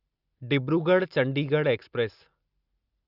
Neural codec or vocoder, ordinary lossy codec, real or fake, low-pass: none; Opus, 64 kbps; real; 5.4 kHz